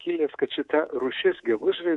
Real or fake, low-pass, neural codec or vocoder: fake; 10.8 kHz; codec, 24 kHz, 3.1 kbps, DualCodec